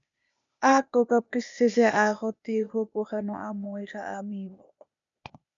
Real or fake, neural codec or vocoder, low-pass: fake; codec, 16 kHz, 0.8 kbps, ZipCodec; 7.2 kHz